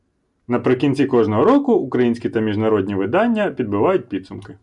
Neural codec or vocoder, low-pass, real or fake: none; 10.8 kHz; real